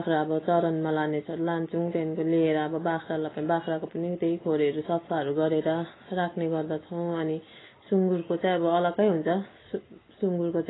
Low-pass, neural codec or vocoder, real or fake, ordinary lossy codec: 7.2 kHz; none; real; AAC, 16 kbps